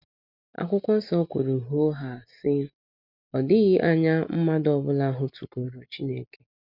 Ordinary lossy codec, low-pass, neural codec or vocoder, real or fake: none; 5.4 kHz; none; real